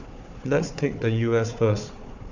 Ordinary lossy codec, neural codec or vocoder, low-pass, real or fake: none; codec, 16 kHz, 4 kbps, FunCodec, trained on Chinese and English, 50 frames a second; 7.2 kHz; fake